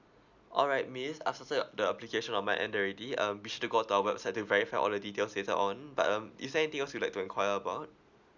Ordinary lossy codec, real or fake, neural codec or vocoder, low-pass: none; real; none; 7.2 kHz